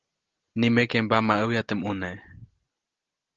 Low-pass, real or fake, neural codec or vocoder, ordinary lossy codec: 7.2 kHz; real; none; Opus, 16 kbps